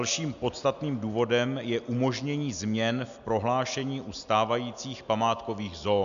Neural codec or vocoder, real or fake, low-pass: none; real; 7.2 kHz